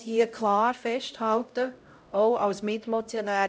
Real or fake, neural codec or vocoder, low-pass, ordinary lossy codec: fake; codec, 16 kHz, 0.5 kbps, X-Codec, HuBERT features, trained on LibriSpeech; none; none